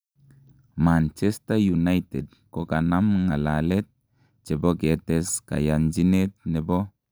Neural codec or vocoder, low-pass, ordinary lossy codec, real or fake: none; none; none; real